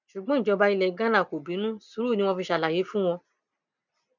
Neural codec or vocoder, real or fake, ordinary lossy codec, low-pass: none; real; none; 7.2 kHz